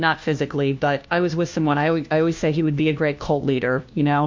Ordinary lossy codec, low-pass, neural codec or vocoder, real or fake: MP3, 48 kbps; 7.2 kHz; codec, 16 kHz, 1 kbps, FunCodec, trained on LibriTTS, 50 frames a second; fake